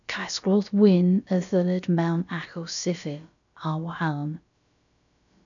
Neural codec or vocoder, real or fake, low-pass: codec, 16 kHz, about 1 kbps, DyCAST, with the encoder's durations; fake; 7.2 kHz